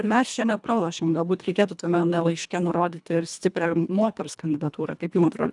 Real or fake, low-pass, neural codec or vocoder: fake; 10.8 kHz; codec, 24 kHz, 1.5 kbps, HILCodec